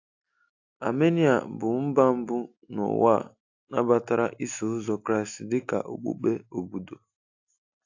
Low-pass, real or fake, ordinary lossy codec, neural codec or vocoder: 7.2 kHz; real; AAC, 48 kbps; none